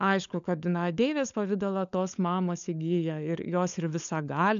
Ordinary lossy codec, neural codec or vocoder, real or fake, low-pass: MP3, 96 kbps; codec, 16 kHz, 16 kbps, FunCodec, trained on LibriTTS, 50 frames a second; fake; 7.2 kHz